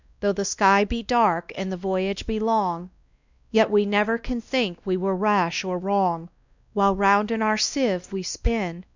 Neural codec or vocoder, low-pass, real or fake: codec, 16 kHz, 1 kbps, X-Codec, WavLM features, trained on Multilingual LibriSpeech; 7.2 kHz; fake